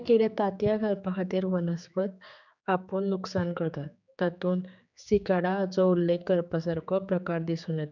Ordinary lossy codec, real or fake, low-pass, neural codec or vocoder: none; fake; 7.2 kHz; codec, 16 kHz, 4 kbps, X-Codec, HuBERT features, trained on general audio